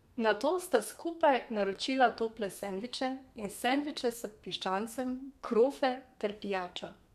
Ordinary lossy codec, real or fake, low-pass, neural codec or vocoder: none; fake; 14.4 kHz; codec, 32 kHz, 1.9 kbps, SNAC